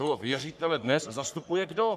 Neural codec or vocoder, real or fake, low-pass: codec, 44.1 kHz, 3.4 kbps, Pupu-Codec; fake; 14.4 kHz